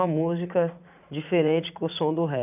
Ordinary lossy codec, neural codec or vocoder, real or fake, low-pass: none; vocoder, 44.1 kHz, 80 mel bands, Vocos; fake; 3.6 kHz